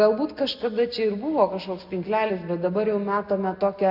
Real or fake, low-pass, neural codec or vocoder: real; 5.4 kHz; none